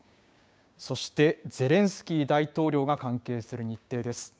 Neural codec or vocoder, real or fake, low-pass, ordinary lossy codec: codec, 16 kHz, 6 kbps, DAC; fake; none; none